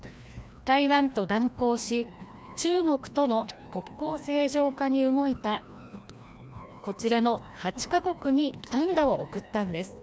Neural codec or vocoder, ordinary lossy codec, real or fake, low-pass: codec, 16 kHz, 1 kbps, FreqCodec, larger model; none; fake; none